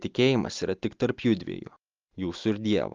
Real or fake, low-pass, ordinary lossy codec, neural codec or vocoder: real; 7.2 kHz; Opus, 32 kbps; none